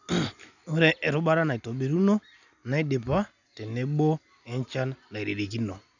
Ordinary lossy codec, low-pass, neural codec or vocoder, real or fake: none; 7.2 kHz; none; real